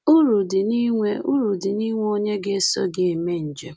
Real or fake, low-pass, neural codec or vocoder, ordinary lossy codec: real; none; none; none